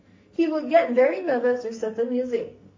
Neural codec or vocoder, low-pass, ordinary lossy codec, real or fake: codec, 44.1 kHz, 2.6 kbps, SNAC; 7.2 kHz; MP3, 32 kbps; fake